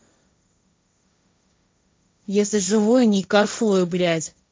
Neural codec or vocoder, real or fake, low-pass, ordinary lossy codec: codec, 16 kHz, 1.1 kbps, Voila-Tokenizer; fake; none; none